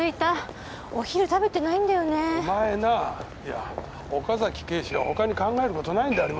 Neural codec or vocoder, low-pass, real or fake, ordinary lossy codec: none; none; real; none